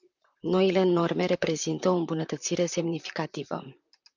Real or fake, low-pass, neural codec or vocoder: fake; 7.2 kHz; vocoder, 44.1 kHz, 128 mel bands, Pupu-Vocoder